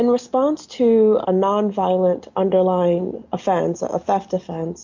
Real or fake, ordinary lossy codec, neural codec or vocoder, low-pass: real; MP3, 64 kbps; none; 7.2 kHz